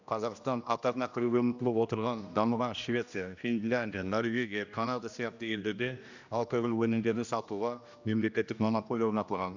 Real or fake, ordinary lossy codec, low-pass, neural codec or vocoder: fake; none; 7.2 kHz; codec, 16 kHz, 1 kbps, X-Codec, HuBERT features, trained on general audio